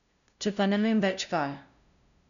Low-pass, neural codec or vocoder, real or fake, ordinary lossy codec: 7.2 kHz; codec, 16 kHz, 0.5 kbps, FunCodec, trained on LibriTTS, 25 frames a second; fake; none